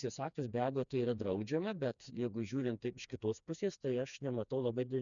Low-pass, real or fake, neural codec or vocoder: 7.2 kHz; fake; codec, 16 kHz, 2 kbps, FreqCodec, smaller model